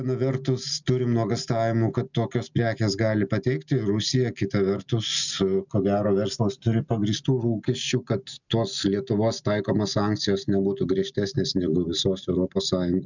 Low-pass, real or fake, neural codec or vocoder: 7.2 kHz; real; none